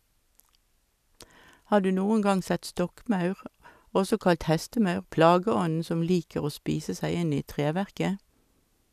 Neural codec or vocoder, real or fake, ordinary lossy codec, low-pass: none; real; none; 14.4 kHz